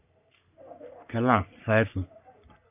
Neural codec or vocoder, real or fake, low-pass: codec, 44.1 kHz, 3.4 kbps, Pupu-Codec; fake; 3.6 kHz